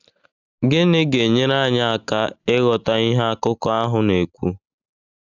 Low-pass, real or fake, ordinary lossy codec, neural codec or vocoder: 7.2 kHz; real; none; none